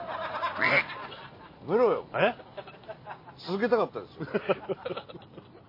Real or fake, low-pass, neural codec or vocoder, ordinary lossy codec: real; 5.4 kHz; none; MP3, 24 kbps